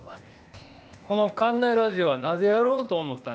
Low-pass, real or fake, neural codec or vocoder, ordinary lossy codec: none; fake; codec, 16 kHz, 0.8 kbps, ZipCodec; none